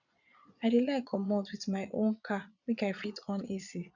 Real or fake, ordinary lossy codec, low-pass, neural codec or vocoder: fake; none; 7.2 kHz; vocoder, 22.05 kHz, 80 mel bands, Vocos